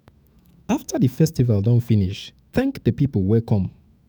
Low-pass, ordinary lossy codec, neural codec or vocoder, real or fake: none; none; autoencoder, 48 kHz, 128 numbers a frame, DAC-VAE, trained on Japanese speech; fake